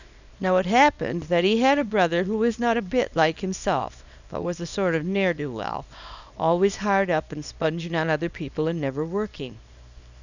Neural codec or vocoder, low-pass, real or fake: codec, 24 kHz, 0.9 kbps, WavTokenizer, small release; 7.2 kHz; fake